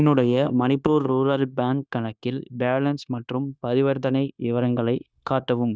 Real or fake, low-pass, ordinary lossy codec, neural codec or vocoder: fake; none; none; codec, 16 kHz, 0.9 kbps, LongCat-Audio-Codec